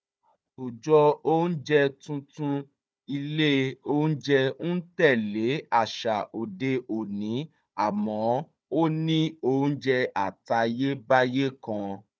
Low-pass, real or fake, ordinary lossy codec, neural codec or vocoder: none; fake; none; codec, 16 kHz, 4 kbps, FunCodec, trained on Chinese and English, 50 frames a second